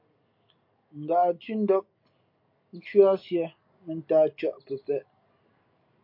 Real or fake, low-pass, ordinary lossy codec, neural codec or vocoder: real; 5.4 kHz; MP3, 32 kbps; none